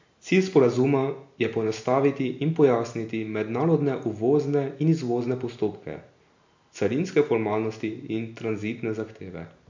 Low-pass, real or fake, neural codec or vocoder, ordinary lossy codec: 7.2 kHz; real; none; MP3, 48 kbps